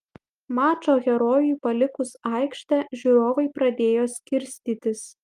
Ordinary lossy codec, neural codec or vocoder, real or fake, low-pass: Opus, 32 kbps; none; real; 14.4 kHz